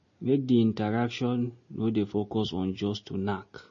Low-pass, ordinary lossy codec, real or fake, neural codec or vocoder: 7.2 kHz; MP3, 32 kbps; real; none